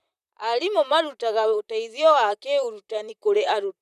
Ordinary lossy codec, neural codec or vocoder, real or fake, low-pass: none; vocoder, 44.1 kHz, 128 mel bands, Pupu-Vocoder; fake; 14.4 kHz